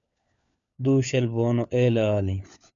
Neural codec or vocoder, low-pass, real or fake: codec, 16 kHz, 4 kbps, FunCodec, trained on LibriTTS, 50 frames a second; 7.2 kHz; fake